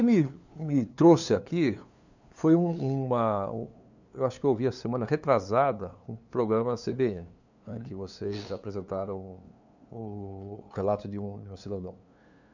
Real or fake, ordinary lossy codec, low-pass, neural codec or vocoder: fake; none; 7.2 kHz; codec, 16 kHz, 2 kbps, FunCodec, trained on LibriTTS, 25 frames a second